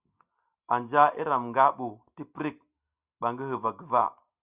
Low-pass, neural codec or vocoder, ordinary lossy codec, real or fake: 3.6 kHz; none; Opus, 64 kbps; real